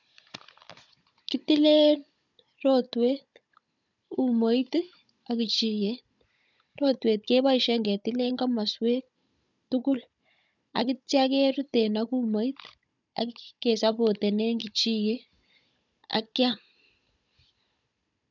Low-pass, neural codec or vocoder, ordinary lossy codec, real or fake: 7.2 kHz; codec, 16 kHz, 16 kbps, FunCodec, trained on Chinese and English, 50 frames a second; none; fake